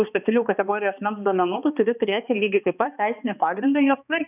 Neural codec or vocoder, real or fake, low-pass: codec, 16 kHz, 2 kbps, X-Codec, HuBERT features, trained on balanced general audio; fake; 3.6 kHz